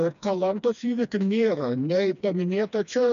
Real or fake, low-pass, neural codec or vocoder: fake; 7.2 kHz; codec, 16 kHz, 2 kbps, FreqCodec, smaller model